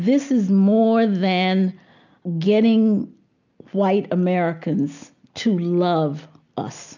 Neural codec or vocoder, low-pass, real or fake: none; 7.2 kHz; real